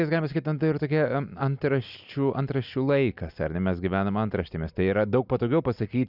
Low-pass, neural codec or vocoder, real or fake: 5.4 kHz; none; real